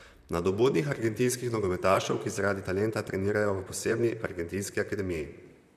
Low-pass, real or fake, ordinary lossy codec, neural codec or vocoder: 14.4 kHz; fake; none; vocoder, 44.1 kHz, 128 mel bands, Pupu-Vocoder